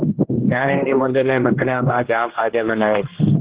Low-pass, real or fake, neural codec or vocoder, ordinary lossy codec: 3.6 kHz; fake; codec, 16 kHz, 1 kbps, X-Codec, HuBERT features, trained on general audio; Opus, 16 kbps